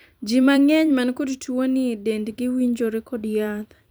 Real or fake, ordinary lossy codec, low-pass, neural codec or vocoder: fake; none; none; vocoder, 44.1 kHz, 128 mel bands every 256 samples, BigVGAN v2